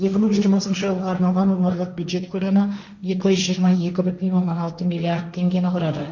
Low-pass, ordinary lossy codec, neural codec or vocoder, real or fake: 7.2 kHz; none; codec, 16 kHz, 1.1 kbps, Voila-Tokenizer; fake